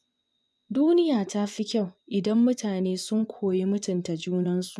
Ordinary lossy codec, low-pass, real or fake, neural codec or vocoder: none; none; fake; vocoder, 24 kHz, 100 mel bands, Vocos